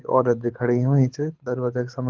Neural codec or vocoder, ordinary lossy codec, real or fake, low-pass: codec, 24 kHz, 6 kbps, HILCodec; Opus, 16 kbps; fake; 7.2 kHz